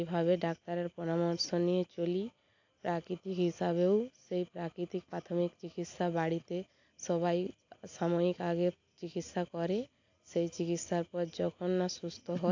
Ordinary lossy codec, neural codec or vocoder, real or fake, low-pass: AAC, 48 kbps; none; real; 7.2 kHz